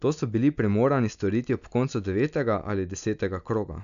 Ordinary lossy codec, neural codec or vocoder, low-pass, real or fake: none; none; 7.2 kHz; real